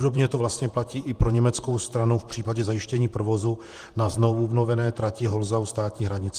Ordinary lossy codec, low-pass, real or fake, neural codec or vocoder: Opus, 24 kbps; 14.4 kHz; fake; vocoder, 44.1 kHz, 128 mel bands, Pupu-Vocoder